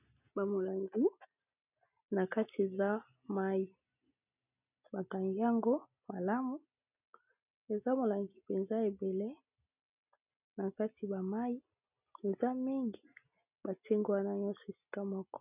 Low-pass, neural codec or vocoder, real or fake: 3.6 kHz; none; real